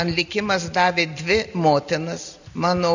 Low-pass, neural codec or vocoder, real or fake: 7.2 kHz; none; real